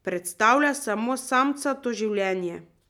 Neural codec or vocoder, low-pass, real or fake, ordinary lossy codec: none; 19.8 kHz; real; none